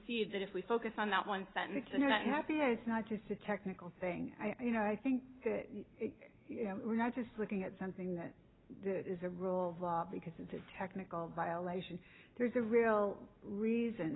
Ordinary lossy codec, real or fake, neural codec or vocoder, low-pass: AAC, 16 kbps; real; none; 7.2 kHz